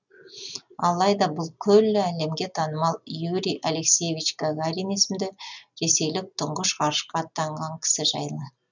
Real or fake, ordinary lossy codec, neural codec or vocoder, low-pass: real; none; none; 7.2 kHz